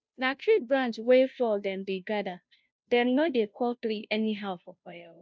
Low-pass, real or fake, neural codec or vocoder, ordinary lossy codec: none; fake; codec, 16 kHz, 0.5 kbps, FunCodec, trained on Chinese and English, 25 frames a second; none